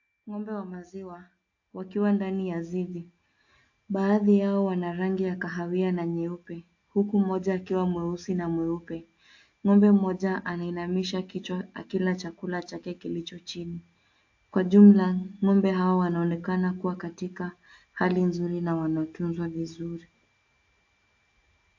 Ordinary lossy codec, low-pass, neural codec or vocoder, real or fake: AAC, 48 kbps; 7.2 kHz; none; real